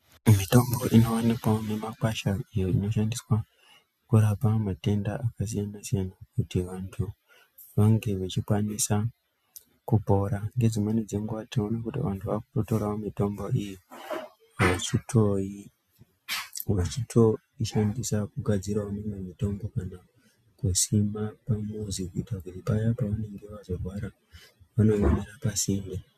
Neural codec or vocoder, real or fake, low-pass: none; real; 14.4 kHz